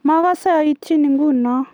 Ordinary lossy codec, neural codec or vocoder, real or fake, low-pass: none; none; real; none